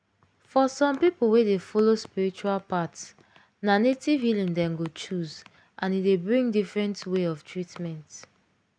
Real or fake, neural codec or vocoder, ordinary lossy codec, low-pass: real; none; none; 9.9 kHz